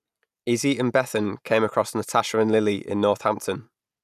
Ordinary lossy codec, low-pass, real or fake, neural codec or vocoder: none; 14.4 kHz; real; none